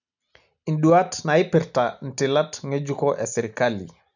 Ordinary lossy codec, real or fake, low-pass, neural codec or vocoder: none; real; 7.2 kHz; none